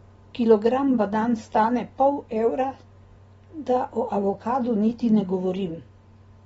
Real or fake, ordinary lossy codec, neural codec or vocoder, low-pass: real; AAC, 24 kbps; none; 19.8 kHz